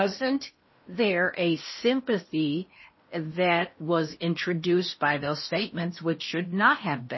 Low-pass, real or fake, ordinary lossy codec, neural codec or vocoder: 7.2 kHz; fake; MP3, 24 kbps; codec, 16 kHz in and 24 kHz out, 0.6 kbps, FocalCodec, streaming, 4096 codes